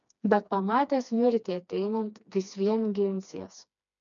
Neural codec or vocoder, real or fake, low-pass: codec, 16 kHz, 2 kbps, FreqCodec, smaller model; fake; 7.2 kHz